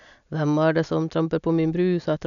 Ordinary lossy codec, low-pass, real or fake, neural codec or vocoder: none; 7.2 kHz; real; none